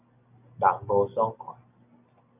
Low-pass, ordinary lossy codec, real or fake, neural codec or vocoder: 3.6 kHz; AAC, 24 kbps; real; none